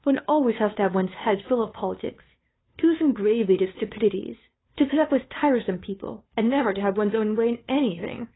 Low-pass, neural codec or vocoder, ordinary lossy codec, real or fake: 7.2 kHz; codec, 16 kHz, 2 kbps, FunCodec, trained on Chinese and English, 25 frames a second; AAC, 16 kbps; fake